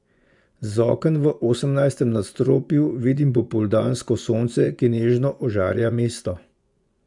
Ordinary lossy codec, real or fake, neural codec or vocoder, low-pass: none; fake; vocoder, 24 kHz, 100 mel bands, Vocos; 10.8 kHz